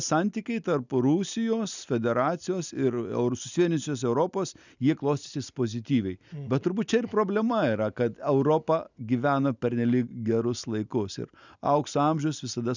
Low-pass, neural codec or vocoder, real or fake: 7.2 kHz; none; real